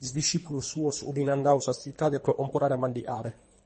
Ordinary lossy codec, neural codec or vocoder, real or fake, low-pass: MP3, 32 kbps; codec, 24 kHz, 3 kbps, HILCodec; fake; 10.8 kHz